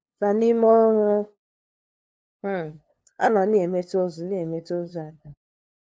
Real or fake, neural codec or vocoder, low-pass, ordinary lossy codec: fake; codec, 16 kHz, 2 kbps, FunCodec, trained on LibriTTS, 25 frames a second; none; none